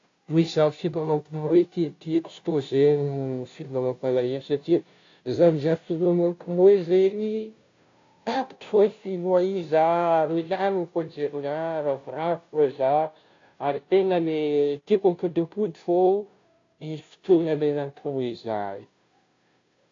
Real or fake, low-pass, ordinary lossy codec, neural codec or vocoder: fake; 7.2 kHz; AAC, 48 kbps; codec, 16 kHz, 0.5 kbps, FunCodec, trained on Chinese and English, 25 frames a second